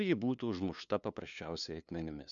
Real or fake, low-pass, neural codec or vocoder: fake; 7.2 kHz; codec, 16 kHz, 2 kbps, FunCodec, trained on LibriTTS, 25 frames a second